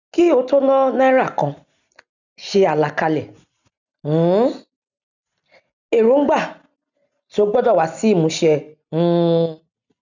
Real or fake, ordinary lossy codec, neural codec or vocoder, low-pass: real; none; none; 7.2 kHz